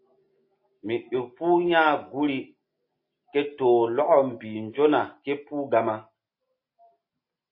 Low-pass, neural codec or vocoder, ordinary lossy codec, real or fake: 5.4 kHz; none; MP3, 24 kbps; real